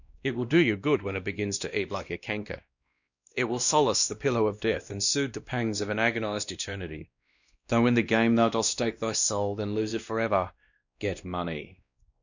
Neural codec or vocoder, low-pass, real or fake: codec, 16 kHz, 1 kbps, X-Codec, WavLM features, trained on Multilingual LibriSpeech; 7.2 kHz; fake